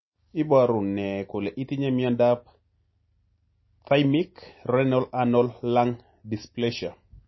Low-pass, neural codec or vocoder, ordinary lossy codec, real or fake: 7.2 kHz; none; MP3, 24 kbps; real